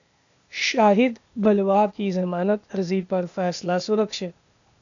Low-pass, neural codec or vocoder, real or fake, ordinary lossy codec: 7.2 kHz; codec, 16 kHz, 0.8 kbps, ZipCodec; fake; MP3, 96 kbps